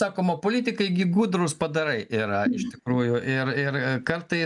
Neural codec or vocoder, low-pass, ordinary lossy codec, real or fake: none; 10.8 kHz; MP3, 96 kbps; real